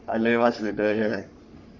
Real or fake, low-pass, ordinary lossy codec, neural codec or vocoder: fake; 7.2 kHz; none; codec, 44.1 kHz, 3.4 kbps, Pupu-Codec